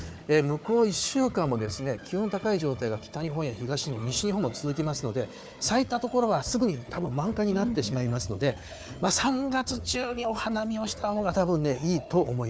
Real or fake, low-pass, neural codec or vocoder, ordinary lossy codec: fake; none; codec, 16 kHz, 4 kbps, FunCodec, trained on Chinese and English, 50 frames a second; none